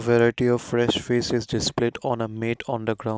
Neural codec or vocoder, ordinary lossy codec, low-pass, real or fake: none; none; none; real